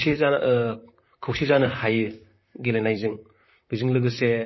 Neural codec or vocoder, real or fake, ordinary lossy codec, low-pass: vocoder, 44.1 kHz, 128 mel bands, Pupu-Vocoder; fake; MP3, 24 kbps; 7.2 kHz